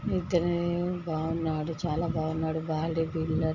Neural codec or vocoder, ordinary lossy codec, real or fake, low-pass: none; none; real; 7.2 kHz